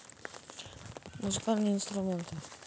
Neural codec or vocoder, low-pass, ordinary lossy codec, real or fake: none; none; none; real